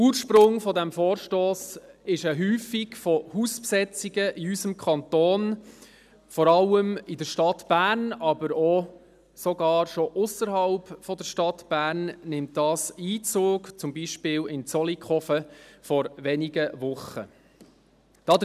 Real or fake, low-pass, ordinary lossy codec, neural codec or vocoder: real; 14.4 kHz; none; none